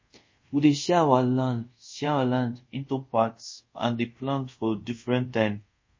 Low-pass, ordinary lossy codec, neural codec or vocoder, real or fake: 7.2 kHz; MP3, 32 kbps; codec, 24 kHz, 0.5 kbps, DualCodec; fake